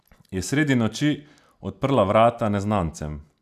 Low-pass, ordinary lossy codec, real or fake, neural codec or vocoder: 14.4 kHz; none; real; none